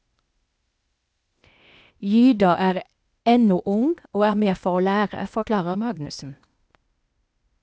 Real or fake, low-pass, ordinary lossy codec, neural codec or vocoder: fake; none; none; codec, 16 kHz, 0.8 kbps, ZipCodec